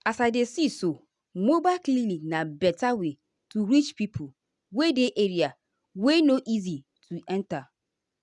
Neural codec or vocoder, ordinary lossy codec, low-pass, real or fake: none; none; 10.8 kHz; real